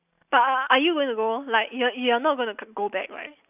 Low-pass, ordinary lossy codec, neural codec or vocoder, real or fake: 3.6 kHz; none; none; real